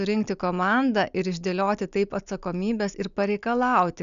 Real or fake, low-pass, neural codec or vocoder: real; 7.2 kHz; none